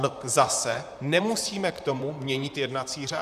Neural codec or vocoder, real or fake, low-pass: vocoder, 44.1 kHz, 128 mel bands, Pupu-Vocoder; fake; 14.4 kHz